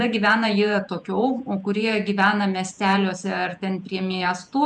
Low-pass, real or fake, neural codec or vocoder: 10.8 kHz; fake; vocoder, 48 kHz, 128 mel bands, Vocos